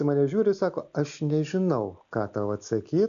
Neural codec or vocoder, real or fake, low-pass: none; real; 7.2 kHz